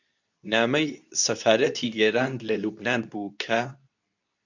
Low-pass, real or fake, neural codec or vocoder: 7.2 kHz; fake; codec, 24 kHz, 0.9 kbps, WavTokenizer, medium speech release version 2